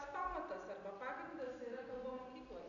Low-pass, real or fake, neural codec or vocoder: 7.2 kHz; real; none